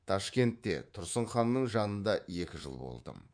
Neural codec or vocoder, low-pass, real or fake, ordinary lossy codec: codec, 24 kHz, 3.1 kbps, DualCodec; 9.9 kHz; fake; none